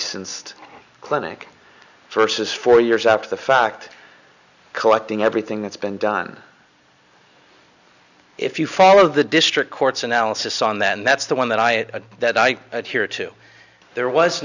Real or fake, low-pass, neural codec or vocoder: real; 7.2 kHz; none